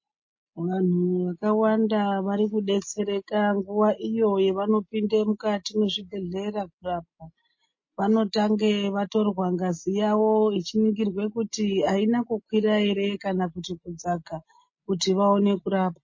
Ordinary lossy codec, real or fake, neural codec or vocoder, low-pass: MP3, 32 kbps; real; none; 7.2 kHz